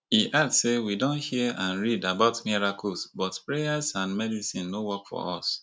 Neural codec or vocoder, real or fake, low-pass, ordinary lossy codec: none; real; none; none